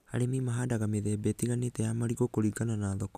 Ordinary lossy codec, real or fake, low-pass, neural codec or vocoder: none; real; 14.4 kHz; none